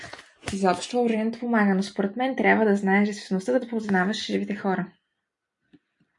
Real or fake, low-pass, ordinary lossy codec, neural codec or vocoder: real; 10.8 kHz; MP3, 64 kbps; none